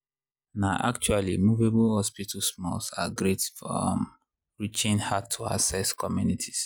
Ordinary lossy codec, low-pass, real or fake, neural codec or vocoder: none; none; real; none